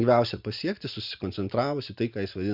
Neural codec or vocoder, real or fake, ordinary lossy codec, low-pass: none; real; Opus, 64 kbps; 5.4 kHz